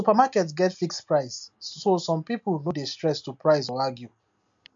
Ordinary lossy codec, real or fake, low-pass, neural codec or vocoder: MP3, 48 kbps; real; 7.2 kHz; none